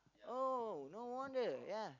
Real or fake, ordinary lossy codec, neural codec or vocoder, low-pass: real; none; none; 7.2 kHz